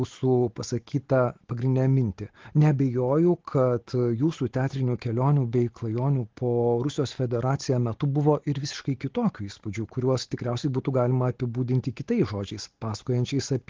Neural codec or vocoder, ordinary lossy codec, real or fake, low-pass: none; Opus, 16 kbps; real; 7.2 kHz